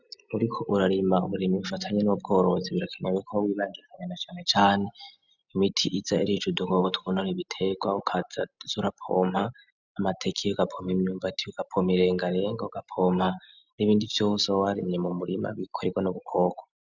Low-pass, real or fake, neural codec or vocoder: 7.2 kHz; real; none